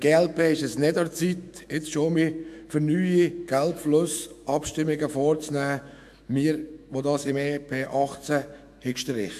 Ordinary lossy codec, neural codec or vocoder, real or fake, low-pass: AAC, 96 kbps; autoencoder, 48 kHz, 128 numbers a frame, DAC-VAE, trained on Japanese speech; fake; 14.4 kHz